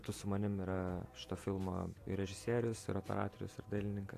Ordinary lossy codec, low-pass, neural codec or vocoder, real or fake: AAC, 64 kbps; 14.4 kHz; vocoder, 48 kHz, 128 mel bands, Vocos; fake